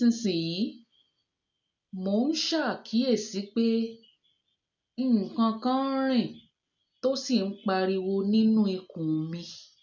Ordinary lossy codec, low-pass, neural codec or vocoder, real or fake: none; 7.2 kHz; none; real